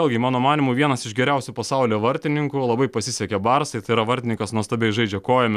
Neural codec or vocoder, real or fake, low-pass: none; real; 14.4 kHz